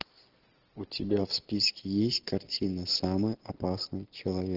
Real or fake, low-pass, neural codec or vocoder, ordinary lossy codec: real; 5.4 kHz; none; Opus, 16 kbps